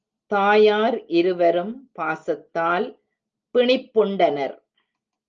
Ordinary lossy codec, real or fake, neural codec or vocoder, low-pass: Opus, 24 kbps; real; none; 7.2 kHz